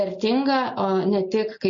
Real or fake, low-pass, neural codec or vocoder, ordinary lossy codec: real; 7.2 kHz; none; MP3, 32 kbps